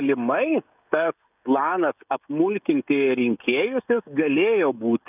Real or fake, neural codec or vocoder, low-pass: fake; codec, 16 kHz, 16 kbps, FreqCodec, smaller model; 3.6 kHz